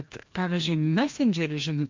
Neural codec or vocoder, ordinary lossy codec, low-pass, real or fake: codec, 16 kHz, 1 kbps, FreqCodec, larger model; MP3, 48 kbps; 7.2 kHz; fake